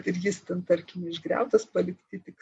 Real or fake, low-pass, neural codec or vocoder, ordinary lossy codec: real; 10.8 kHz; none; MP3, 48 kbps